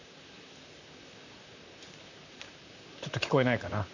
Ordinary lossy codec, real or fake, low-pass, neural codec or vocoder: none; fake; 7.2 kHz; vocoder, 44.1 kHz, 128 mel bands, Pupu-Vocoder